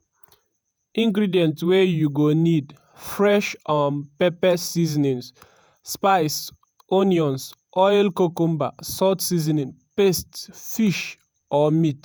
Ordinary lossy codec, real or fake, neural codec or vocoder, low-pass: none; fake; vocoder, 48 kHz, 128 mel bands, Vocos; none